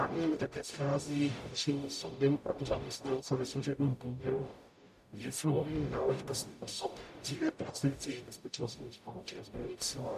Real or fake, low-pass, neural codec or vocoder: fake; 14.4 kHz; codec, 44.1 kHz, 0.9 kbps, DAC